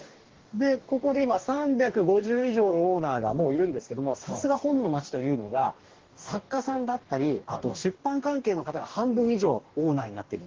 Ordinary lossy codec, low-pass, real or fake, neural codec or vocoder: Opus, 16 kbps; 7.2 kHz; fake; codec, 44.1 kHz, 2.6 kbps, DAC